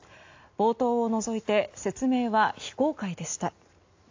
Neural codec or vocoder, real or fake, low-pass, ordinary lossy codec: none; real; 7.2 kHz; AAC, 48 kbps